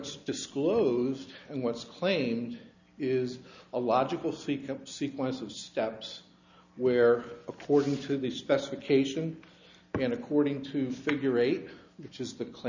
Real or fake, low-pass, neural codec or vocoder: real; 7.2 kHz; none